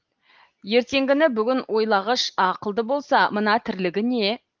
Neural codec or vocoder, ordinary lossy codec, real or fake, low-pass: none; Opus, 32 kbps; real; 7.2 kHz